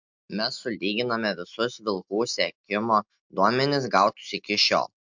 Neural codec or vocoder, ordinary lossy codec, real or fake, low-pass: none; MP3, 64 kbps; real; 7.2 kHz